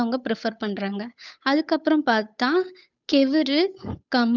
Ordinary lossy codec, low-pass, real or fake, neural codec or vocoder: none; 7.2 kHz; fake; codec, 16 kHz, 8 kbps, FunCodec, trained on Chinese and English, 25 frames a second